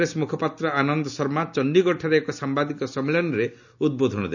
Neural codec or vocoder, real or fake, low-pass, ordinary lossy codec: none; real; 7.2 kHz; none